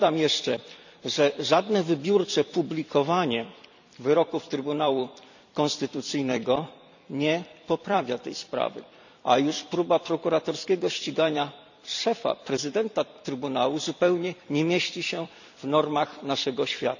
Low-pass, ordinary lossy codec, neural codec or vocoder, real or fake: 7.2 kHz; none; vocoder, 44.1 kHz, 128 mel bands every 256 samples, BigVGAN v2; fake